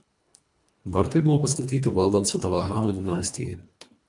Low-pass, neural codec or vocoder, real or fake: 10.8 kHz; codec, 24 kHz, 1.5 kbps, HILCodec; fake